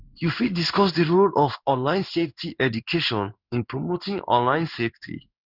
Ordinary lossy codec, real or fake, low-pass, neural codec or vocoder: none; fake; 5.4 kHz; codec, 16 kHz in and 24 kHz out, 1 kbps, XY-Tokenizer